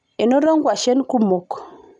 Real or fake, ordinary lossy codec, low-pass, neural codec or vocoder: real; none; 10.8 kHz; none